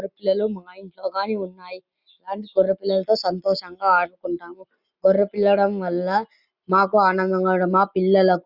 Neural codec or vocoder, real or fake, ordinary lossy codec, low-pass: none; real; Opus, 64 kbps; 5.4 kHz